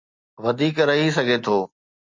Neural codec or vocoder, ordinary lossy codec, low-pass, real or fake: none; MP3, 48 kbps; 7.2 kHz; real